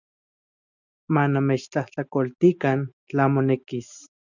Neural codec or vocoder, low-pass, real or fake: none; 7.2 kHz; real